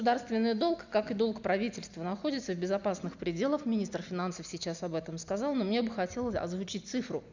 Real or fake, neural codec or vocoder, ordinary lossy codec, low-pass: real; none; none; 7.2 kHz